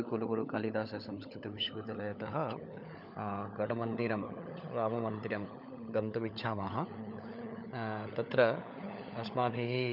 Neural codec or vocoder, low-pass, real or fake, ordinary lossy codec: codec, 16 kHz, 16 kbps, FunCodec, trained on LibriTTS, 50 frames a second; 5.4 kHz; fake; none